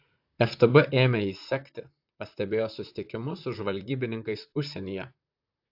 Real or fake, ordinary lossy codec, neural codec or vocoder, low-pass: fake; AAC, 48 kbps; vocoder, 44.1 kHz, 128 mel bands, Pupu-Vocoder; 5.4 kHz